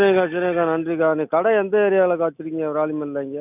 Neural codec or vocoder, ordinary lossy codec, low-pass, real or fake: none; none; 3.6 kHz; real